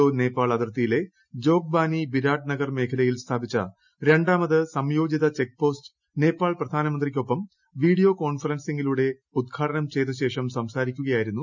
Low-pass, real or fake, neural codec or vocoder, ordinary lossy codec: 7.2 kHz; real; none; none